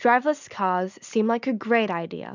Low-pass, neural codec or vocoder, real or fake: 7.2 kHz; none; real